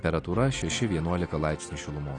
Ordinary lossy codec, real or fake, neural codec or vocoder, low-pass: AAC, 32 kbps; real; none; 9.9 kHz